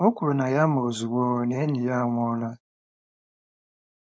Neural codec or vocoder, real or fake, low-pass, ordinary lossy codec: codec, 16 kHz, 4.8 kbps, FACodec; fake; none; none